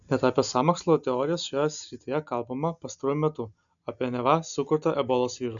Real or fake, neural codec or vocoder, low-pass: real; none; 7.2 kHz